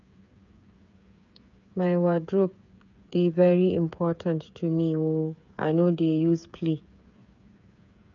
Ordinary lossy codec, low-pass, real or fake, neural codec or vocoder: none; 7.2 kHz; fake; codec, 16 kHz, 8 kbps, FreqCodec, smaller model